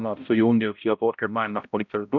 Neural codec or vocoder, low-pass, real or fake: codec, 16 kHz, 0.5 kbps, X-Codec, HuBERT features, trained on balanced general audio; 7.2 kHz; fake